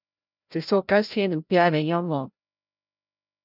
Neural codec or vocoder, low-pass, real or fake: codec, 16 kHz, 0.5 kbps, FreqCodec, larger model; 5.4 kHz; fake